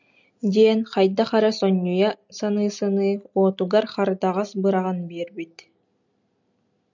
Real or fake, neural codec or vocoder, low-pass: real; none; 7.2 kHz